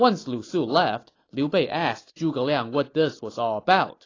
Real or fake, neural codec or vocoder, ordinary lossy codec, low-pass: real; none; AAC, 32 kbps; 7.2 kHz